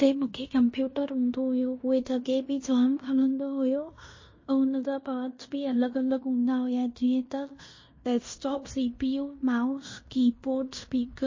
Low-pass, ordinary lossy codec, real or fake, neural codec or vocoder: 7.2 kHz; MP3, 32 kbps; fake; codec, 16 kHz in and 24 kHz out, 0.9 kbps, LongCat-Audio-Codec, fine tuned four codebook decoder